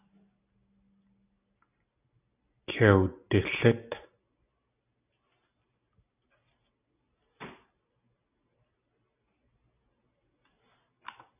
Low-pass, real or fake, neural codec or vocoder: 3.6 kHz; real; none